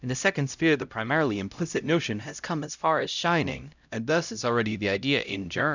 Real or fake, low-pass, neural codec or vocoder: fake; 7.2 kHz; codec, 16 kHz, 0.5 kbps, X-Codec, HuBERT features, trained on LibriSpeech